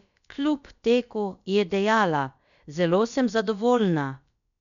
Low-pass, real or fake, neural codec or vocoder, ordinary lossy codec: 7.2 kHz; fake; codec, 16 kHz, about 1 kbps, DyCAST, with the encoder's durations; none